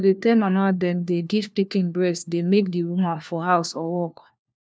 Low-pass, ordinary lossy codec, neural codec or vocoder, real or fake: none; none; codec, 16 kHz, 1 kbps, FunCodec, trained on LibriTTS, 50 frames a second; fake